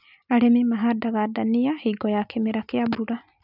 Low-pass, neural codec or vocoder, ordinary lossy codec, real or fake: 5.4 kHz; none; none; real